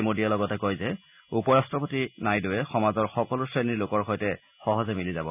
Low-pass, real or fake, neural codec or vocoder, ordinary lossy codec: 3.6 kHz; real; none; none